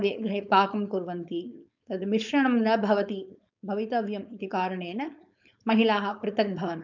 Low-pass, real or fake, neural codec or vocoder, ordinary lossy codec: 7.2 kHz; fake; codec, 16 kHz, 4.8 kbps, FACodec; none